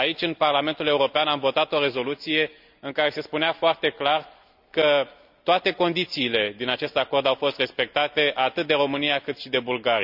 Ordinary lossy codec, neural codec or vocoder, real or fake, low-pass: none; none; real; 5.4 kHz